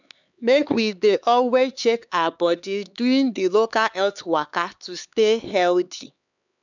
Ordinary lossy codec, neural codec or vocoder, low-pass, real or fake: none; codec, 16 kHz, 4 kbps, X-Codec, HuBERT features, trained on LibriSpeech; 7.2 kHz; fake